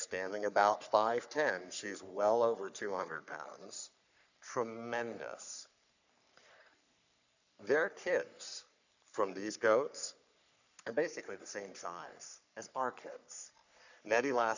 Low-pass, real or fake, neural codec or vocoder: 7.2 kHz; fake; codec, 44.1 kHz, 3.4 kbps, Pupu-Codec